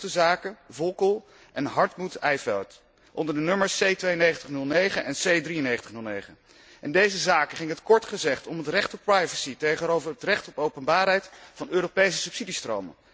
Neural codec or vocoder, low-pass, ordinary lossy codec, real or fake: none; none; none; real